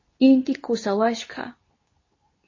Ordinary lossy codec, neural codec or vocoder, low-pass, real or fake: MP3, 32 kbps; codec, 24 kHz, 0.9 kbps, WavTokenizer, medium speech release version 2; 7.2 kHz; fake